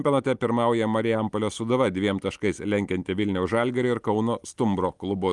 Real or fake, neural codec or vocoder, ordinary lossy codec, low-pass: real; none; Opus, 32 kbps; 10.8 kHz